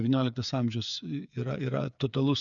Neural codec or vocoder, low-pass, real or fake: codec, 16 kHz, 8 kbps, FreqCodec, smaller model; 7.2 kHz; fake